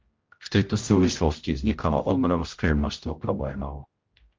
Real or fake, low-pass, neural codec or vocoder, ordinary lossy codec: fake; 7.2 kHz; codec, 16 kHz, 0.5 kbps, X-Codec, HuBERT features, trained on general audio; Opus, 24 kbps